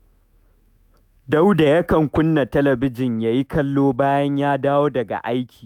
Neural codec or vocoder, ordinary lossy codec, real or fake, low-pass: autoencoder, 48 kHz, 128 numbers a frame, DAC-VAE, trained on Japanese speech; none; fake; 19.8 kHz